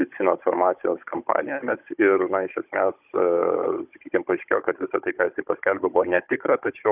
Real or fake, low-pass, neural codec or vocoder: fake; 3.6 kHz; codec, 16 kHz, 16 kbps, FunCodec, trained on Chinese and English, 50 frames a second